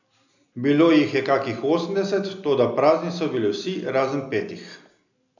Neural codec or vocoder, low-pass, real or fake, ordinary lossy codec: none; 7.2 kHz; real; none